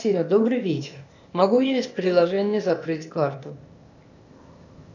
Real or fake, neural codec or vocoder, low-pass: fake; codec, 16 kHz, 0.8 kbps, ZipCodec; 7.2 kHz